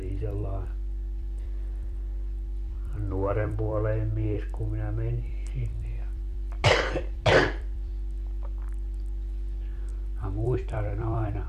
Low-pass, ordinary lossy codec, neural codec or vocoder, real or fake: 14.4 kHz; MP3, 64 kbps; vocoder, 44.1 kHz, 128 mel bands every 256 samples, BigVGAN v2; fake